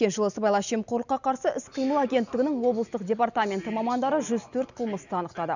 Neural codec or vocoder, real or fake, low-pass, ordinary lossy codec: none; real; 7.2 kHz; none